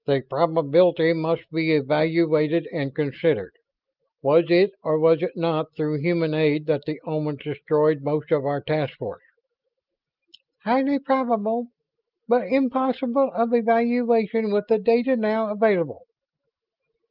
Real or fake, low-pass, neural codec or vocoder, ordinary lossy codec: real; 5.4 kHz; none; Opus, 32 kbps